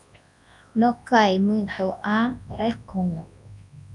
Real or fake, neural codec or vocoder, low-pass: fake; codec, 24 kHz, 0.9 kbps, WavTokenizer, large speech release; 10.8 kHz